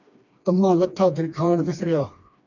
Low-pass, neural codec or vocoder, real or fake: 7.2 kHz; codec, 16 kHz, 2 kbps, FreqCodec, smaller model; fake